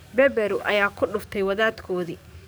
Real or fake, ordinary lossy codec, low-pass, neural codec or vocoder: fake; none; none; codec, 44.1 kHz, 7.8 kbps, DAC